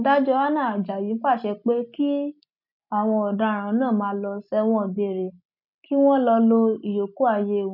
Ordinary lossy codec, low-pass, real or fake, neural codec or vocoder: none; 5.4 kHz; real; none